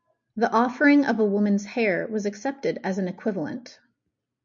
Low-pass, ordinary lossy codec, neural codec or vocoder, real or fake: 7.2 kHz; MP3, 64 kbps; none; real